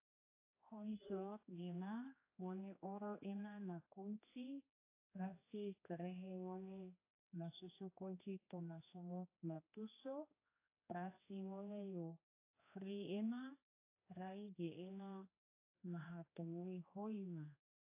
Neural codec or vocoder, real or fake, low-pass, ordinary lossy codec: codec, 16 kHz, 2 kbps, X-Codec, HuBERT features, trained on general audio; fake; 3.6 kHz; AAC, 24 kbps